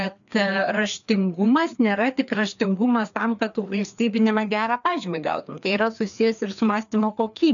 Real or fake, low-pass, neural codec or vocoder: fake; 7.2 kHz; codec, 16 kHz, 2 kbps, FreqCodec, larger model